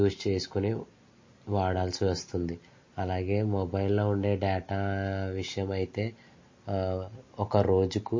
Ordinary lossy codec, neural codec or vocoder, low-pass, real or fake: MP3, 32 kbps; none; 7.2 kHz; real